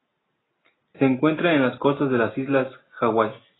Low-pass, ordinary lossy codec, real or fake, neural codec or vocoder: 7.2 kHz; AAC, 16 kbps; real; none